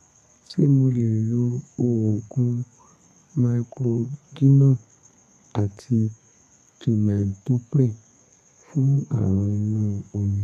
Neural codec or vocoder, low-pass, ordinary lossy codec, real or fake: codec, 32 kHz, 1.9 kbps, SNAC; 14.4 kHz; none; fake